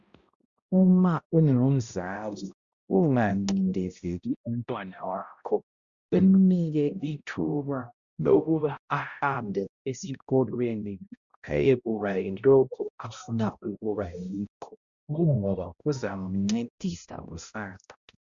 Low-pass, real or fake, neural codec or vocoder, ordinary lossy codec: 7.2 kHz; fake; codec, 16 kHz, 0.5 kbps, X-Codec, HuBERT features, trained on balanced general audio; Opus, 64 kbps